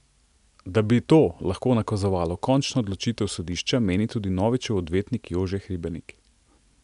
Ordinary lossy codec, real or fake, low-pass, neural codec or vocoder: none; real; 10.8 kHz; none